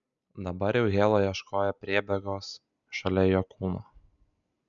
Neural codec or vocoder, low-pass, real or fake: none; 7.2 kHz; real